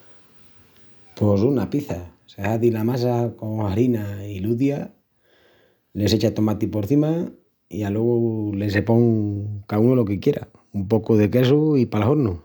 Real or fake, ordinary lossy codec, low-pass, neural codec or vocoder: real; none; 19.8 kHz; none